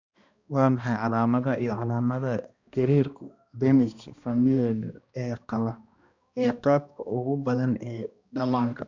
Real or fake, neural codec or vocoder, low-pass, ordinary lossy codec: fake; codec, 16 kHz, 1 kbps, X-Codec, HuBERT features, trained on balanced general audio; 7.2 kHz; Opus, 64 kbps